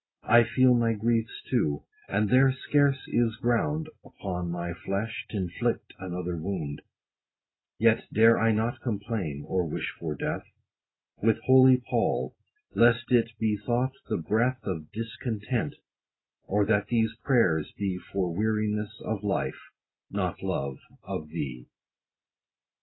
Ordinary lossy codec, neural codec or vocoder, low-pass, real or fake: AAC, 16 kbps; none; 7.2 kHz; real